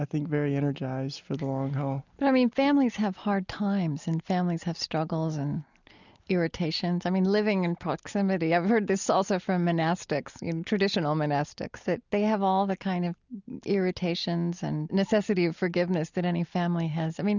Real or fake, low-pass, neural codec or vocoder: real; 7.2 kHz; none